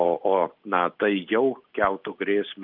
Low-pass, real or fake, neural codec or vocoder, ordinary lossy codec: 5.4 kHz; real; none; Opus, 24 kbps